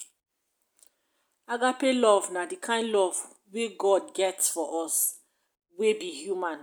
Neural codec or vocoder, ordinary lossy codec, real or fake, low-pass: none; none; real; none